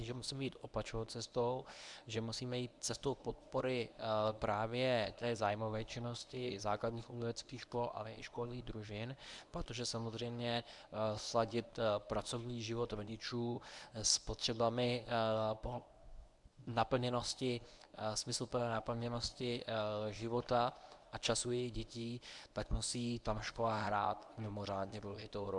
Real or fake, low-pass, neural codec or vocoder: fake; 10.8 kHz; codec, 24 kHz, 0.9 kbps, WavTokenizer, medium speech release version 1